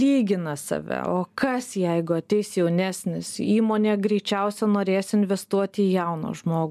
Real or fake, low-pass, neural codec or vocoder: real; 14.4 kHz; none